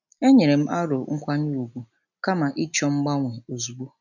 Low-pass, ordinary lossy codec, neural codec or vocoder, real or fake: 7.2 kHz; none; none; real